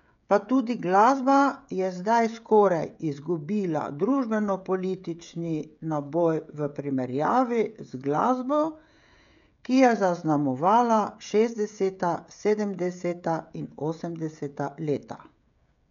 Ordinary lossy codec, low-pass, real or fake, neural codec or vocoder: none; 7.2 kHz; fake; codec, 16 kHz, 16 kbps, FreqCodec, smaller model